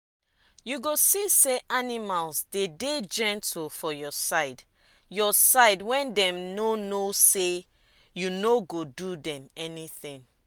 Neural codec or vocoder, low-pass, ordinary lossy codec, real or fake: none; none; none; real